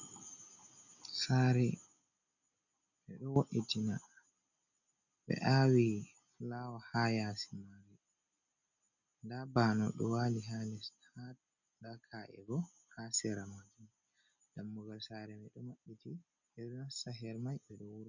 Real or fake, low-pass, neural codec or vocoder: real; 7.2 kHz; none